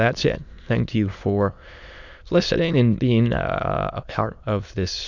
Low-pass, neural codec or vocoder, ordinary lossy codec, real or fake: 7.2 kHz; autoencoder, 22.05 kHz, a latent of 192 numbers a frame, VITS, trained on many speakers; Opus, 64 kbps; fake